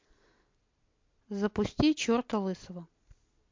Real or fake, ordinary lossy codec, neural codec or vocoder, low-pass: real; MP3, 48 kbps; none; 7.2 kHz